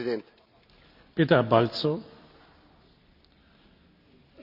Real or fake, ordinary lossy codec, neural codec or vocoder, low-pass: real; none; none; 5.4 kHz